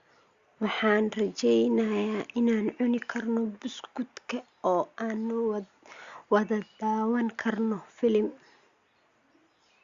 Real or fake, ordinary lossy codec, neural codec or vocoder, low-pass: real; Opus, 64 kbps; none; 7.2 kHz